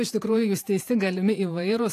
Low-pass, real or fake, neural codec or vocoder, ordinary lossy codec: 14.4 kHz; fake; vocoder, 48 kHz, 128 mel bands, Vocos; AAC, 64 kbps